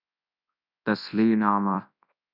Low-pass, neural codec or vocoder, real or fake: 5.4 kHz; codec, 24 kHz, 0.9 kbps, WavTokenizer, large speech release; fake